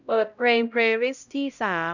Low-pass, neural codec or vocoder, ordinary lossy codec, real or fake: 7.2 kHz; codec, 16 kHz, 0.5 kbps, X-Codec, HuBERT features, trained on LibriSpeech; none; fake